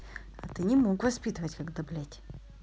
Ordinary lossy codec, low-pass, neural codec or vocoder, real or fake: none; none; none; real